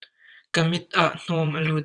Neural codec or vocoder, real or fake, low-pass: vocoder, 22.05 kHz, 80 mel bands, WaveNeXt; fake; 9.9 kHz